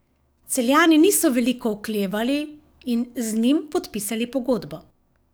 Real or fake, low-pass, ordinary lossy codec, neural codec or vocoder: fake; none; none; codec, 44.1 kHz, 7.8 kbps, DAC